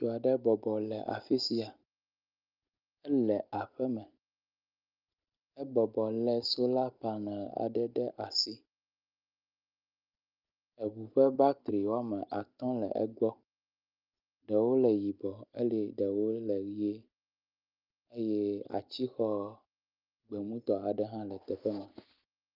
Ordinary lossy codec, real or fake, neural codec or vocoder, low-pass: Opus, 24 kbps; real; none; 5.4 kHz